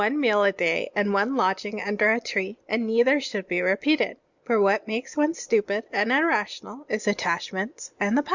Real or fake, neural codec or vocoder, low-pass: real; none; 7.2 kHz